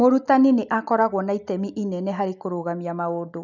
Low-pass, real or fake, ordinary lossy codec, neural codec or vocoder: 7.2 kHz; real; none; none